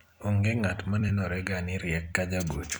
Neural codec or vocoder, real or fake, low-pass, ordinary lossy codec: vocoder, 44.1 kHz, 128 mel bands every 256 samples, BigVGAN v2; fake; none; none